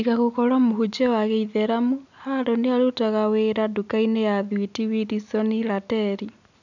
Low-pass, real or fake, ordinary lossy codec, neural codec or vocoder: 7.2 kHz; real; none; none